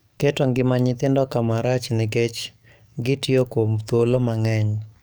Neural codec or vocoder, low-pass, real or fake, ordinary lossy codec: codec, 44.1 kHz, 7.8 kbps, DAC; none; fake; none